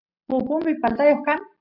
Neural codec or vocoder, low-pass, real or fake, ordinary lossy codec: none; 5.4 kHz; real; AAC, 48 kbps